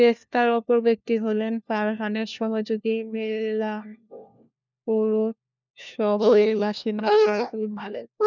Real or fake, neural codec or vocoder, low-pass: fake; codec, 16 kHz, 1 kbps, FunCodec, trained on LibriTTS, 50 frames a second; 7.2 kHz